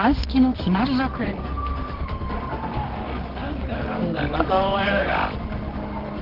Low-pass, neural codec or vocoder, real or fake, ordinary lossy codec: 5.4 kHz; codec, 24 kHz, 0.9 kbps, WavTokenizer, medium music audio release; fake; Opus, 16 kbps